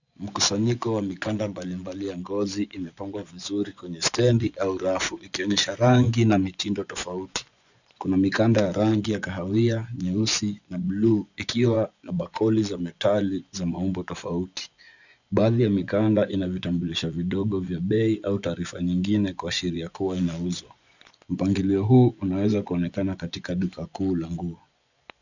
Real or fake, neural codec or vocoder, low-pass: fake; vocoder, 24 kHz, 100 mel bands, Vocos; 7.2 kHz